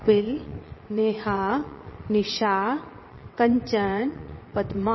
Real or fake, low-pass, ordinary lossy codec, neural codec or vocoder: fake; 7.2 kHz; MP3, 24 kbps; vocoder, 22.05 kHz, 80 mel bands, WaveNeXt